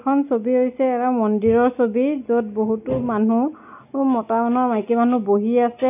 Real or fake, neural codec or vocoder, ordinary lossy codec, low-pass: real; none; none; 3.6 kHz